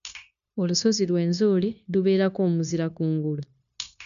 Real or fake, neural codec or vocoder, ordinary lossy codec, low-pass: fake; codec, 16 kHz, 0.9 kbps, LongCat-Audio-Codec; none; 7.2 kHz